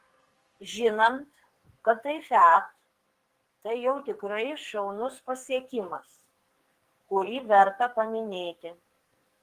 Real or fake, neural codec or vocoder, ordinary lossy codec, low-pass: fake; codec, 44.1 kHz, 2.6 kbps, SNAC; Opus, 24 kbps; 14.4 kHz